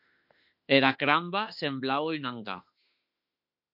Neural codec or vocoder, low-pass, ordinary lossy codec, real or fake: autoencoder, 48 kHz, 32 numbers a frame, DAC-VAE, trained on Japanese speech; 5.4 kHz; MP3, 48 kbps; fake